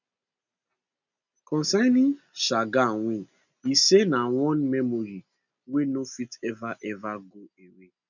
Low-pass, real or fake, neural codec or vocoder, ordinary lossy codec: 7.2 kHz; real; none; none